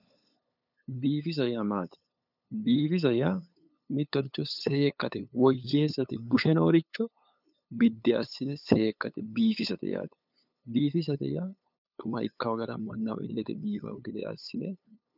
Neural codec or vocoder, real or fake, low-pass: codec, 16 kHz, 8 kbps, FunCodec, trained on LibriTTS, 25 frames a second; fake; 5.4 kHz